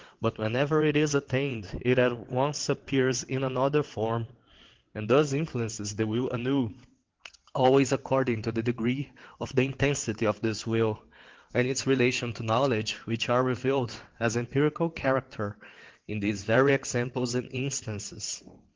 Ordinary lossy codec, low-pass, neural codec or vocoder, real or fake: Opus, 16 kbps; 7.2 kHz; vocoder, 22.05 kHz, 80 mel bands, WaveNeXt; fake